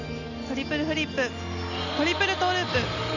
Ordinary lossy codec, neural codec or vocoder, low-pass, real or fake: none; none; 7.2 kHz; real